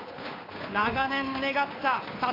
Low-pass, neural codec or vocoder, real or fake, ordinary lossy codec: 5.4 kHz; codec, 16 kHz, 2 kbps, FunCodec, trained on Chinese and English, 25 frames a second; fake; AAC, 24 kbps